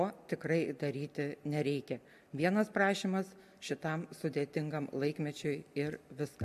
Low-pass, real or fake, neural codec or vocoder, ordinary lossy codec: 14.4 kHz; real; none; MP3, 64 kbps